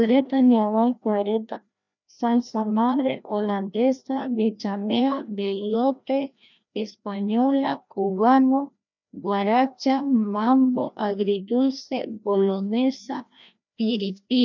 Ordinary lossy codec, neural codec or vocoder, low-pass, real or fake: none; codec, 16 kHz, 1 kbps, FreqCodec, larger model; 7.2 kHz; fake